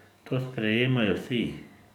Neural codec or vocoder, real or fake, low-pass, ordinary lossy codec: codec, 44.1 kHz, 7.8 kbps, DAC; fake; 19.8 kHz; none